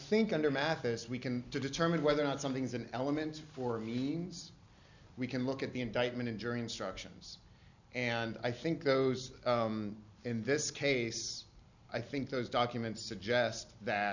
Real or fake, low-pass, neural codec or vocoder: real; 7.2 kHz; none